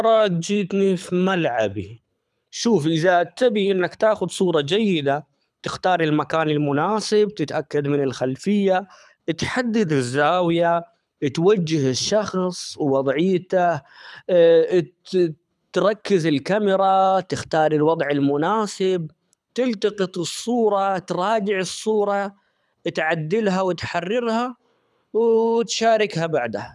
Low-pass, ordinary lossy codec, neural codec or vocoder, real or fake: none; none; codec, 24 kHz, 6 kbps, HILCodec; fake